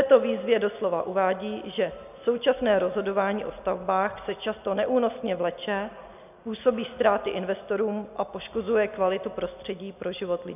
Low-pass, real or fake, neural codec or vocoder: 3.6 kHz; real; none